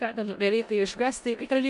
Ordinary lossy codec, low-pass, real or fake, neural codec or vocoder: MP3, 96 kbps; 10.8 kHz; fake; codec, 16 kHz in and 24 kHz out, 0.4 kbps, LongCat-Audio-Codec, four codebook decoder